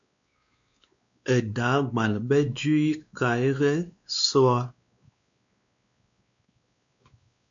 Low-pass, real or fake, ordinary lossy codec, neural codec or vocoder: 7.2 kHz; fake; MP3, 64 kbps; codec, 16 kHz, 2 kbps, X-Codec, WavLM features, trained on Multilingual LibriSpeech